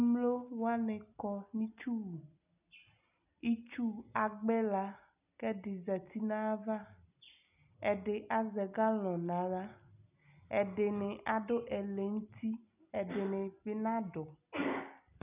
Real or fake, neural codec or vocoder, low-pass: real; none; 3.6 kHz